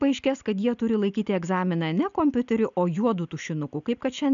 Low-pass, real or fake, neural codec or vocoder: 7.2 kHz; real; none